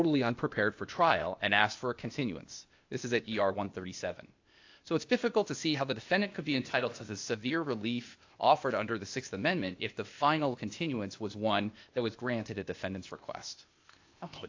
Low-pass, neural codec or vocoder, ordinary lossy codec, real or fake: 7.2 kHz; codec, 16 kHz, 0.8 kbps, ZipCodec; AAC, 48 kbps; fake